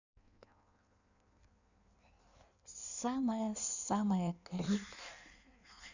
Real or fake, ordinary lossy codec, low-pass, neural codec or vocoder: fake; none; 7.2 kHz; codec, 16 kHz in and 24 kHz out, 1.1 kbps, FireRedTTS-2 codec